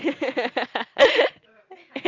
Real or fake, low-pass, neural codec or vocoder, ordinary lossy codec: real; 7.2 kHz; none; Opus, 24 kbps